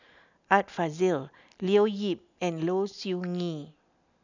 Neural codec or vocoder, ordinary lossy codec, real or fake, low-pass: none; none; real; 7.2 kHz